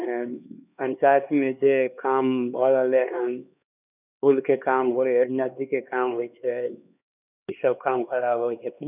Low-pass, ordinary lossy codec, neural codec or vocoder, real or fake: 3.6 kHz; none; codec, 16 kHz, 2 kbps, X-Codec, HuBERT features, trained on LibriSpeech; fake